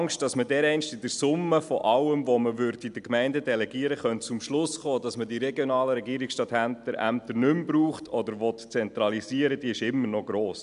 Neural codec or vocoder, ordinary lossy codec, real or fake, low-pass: none; MP3, 96 kbps; real; 10.8 kHz